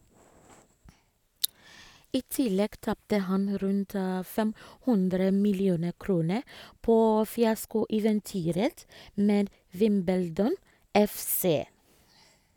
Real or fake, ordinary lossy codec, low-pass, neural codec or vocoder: real; none; 19.8 kHz; none